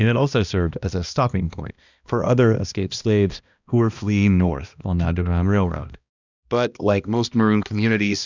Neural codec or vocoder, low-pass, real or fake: codec, 16 kHz, 1 kbps, X-Codec, HuBERT features, trained on balanced general audio; 7.2 kHz; fake